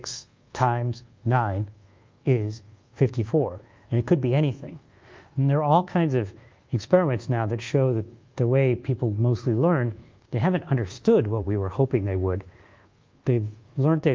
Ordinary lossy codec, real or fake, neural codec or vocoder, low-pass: Opus, 24 kbps; fake; codec, 24 kHz, 1.2 kbps, DualCodec; 7.2 kHz